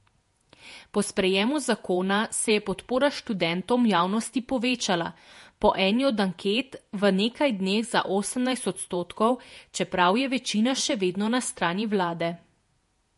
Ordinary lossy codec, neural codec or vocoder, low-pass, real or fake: MP3, 48 kbps; vocoder, 48 kHz, 128 mel bands, Vocos; 14.4 kHz; fake